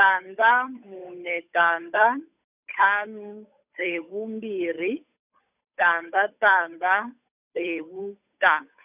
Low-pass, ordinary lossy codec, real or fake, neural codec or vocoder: 3.6 kHz; none; fake; codec, 16 kHz, 8 kbps, FunCodec, trained on Chinese and English, 25 frames a second